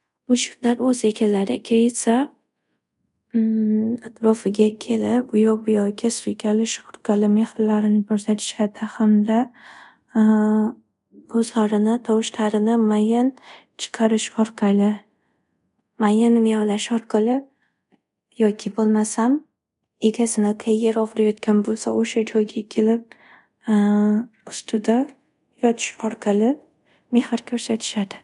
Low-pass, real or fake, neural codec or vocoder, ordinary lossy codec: 10.8 kHz; fake; codec, 24 kHz, 0.5 kbps, DualCodec; MP3, 64 kbps